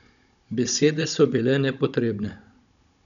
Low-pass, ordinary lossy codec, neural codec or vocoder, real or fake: 7.2 kHz; none; codec, 16 kHz, 16 kbps, FunCodec, trained on Chinese and English, 50 frames a second; fake